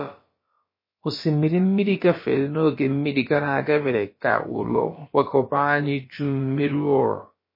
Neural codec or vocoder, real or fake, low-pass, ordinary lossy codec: codec, 16 kHz, about 1 kbps, DyCAST, with the encoder's durations; fake; 5.4 kHz; MP3, 24 kbps